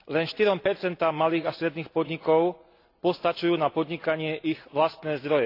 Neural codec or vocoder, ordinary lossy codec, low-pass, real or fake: none; AAC, 32 kbps; 5.4 kHz; real